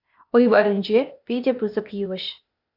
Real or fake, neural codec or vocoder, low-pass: fake; codec, 16 kHz, 0.8 kbps, ZipCodec; 5.4 kHz